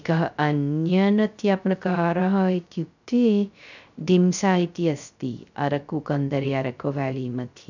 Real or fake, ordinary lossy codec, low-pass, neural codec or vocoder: fake; none; 7.2 kHz; codec, 16 kHz, 0.3 kbps, FocalCodec